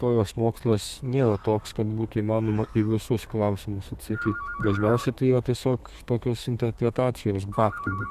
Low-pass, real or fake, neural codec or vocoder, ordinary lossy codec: 14.4 kHz; fake; codec, 32 kHz, 1.9 kbps, SNAC; Opus, 64 kbps